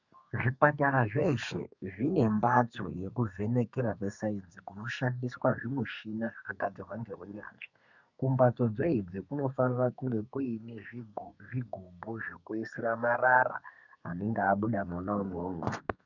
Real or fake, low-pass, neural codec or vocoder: fake; 7.2 kHz; codec, 32 kHz, 1.9 kbps, SNAC